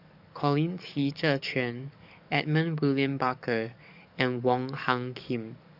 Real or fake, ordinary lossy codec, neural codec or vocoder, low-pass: fake; none; codec, 44.1 kHz, 7.8 kbps, DAC; 5.4 kHz